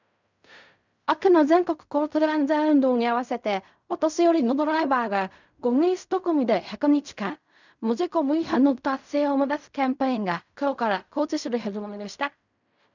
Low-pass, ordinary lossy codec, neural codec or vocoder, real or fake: 7.2 kHz; none; codec, 16 kHz in and 24 kHz out, 0.4 kbps, LongCat-Audio-Codec, fine tuned four codebook decoder; fake